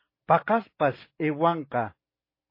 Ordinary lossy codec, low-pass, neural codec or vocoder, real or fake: MP3, 24 kbps; 5.4 kHz; none; real